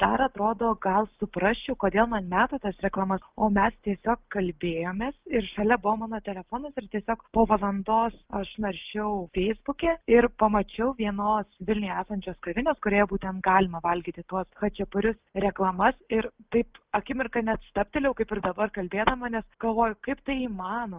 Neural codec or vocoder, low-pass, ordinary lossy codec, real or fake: none; 3.6 kHz; Opus, 32 kbps; real